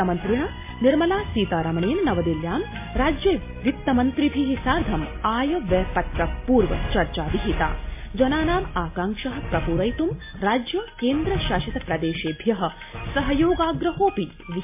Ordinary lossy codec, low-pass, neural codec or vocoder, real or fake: MP3, 24 kbps; 3.6 kHz; none; real